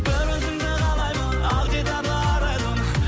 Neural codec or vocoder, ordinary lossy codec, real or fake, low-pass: none; none; real; none